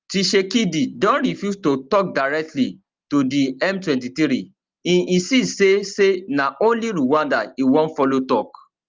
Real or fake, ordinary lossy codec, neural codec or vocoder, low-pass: real; Opus, 32 kbps; none; 7.2 kHz